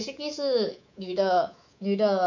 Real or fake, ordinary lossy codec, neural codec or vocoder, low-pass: fake; none; codec, 24 kHz, 3.1 kbps, DualCodec; 7.2 kHz